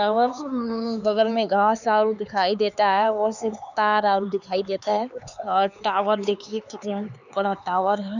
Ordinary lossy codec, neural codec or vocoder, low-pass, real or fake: none; codec, 16 kHz, 4 kbps, X-Codec, HuBERT features, trained on LibriSpeech; 7.2 kHz; fake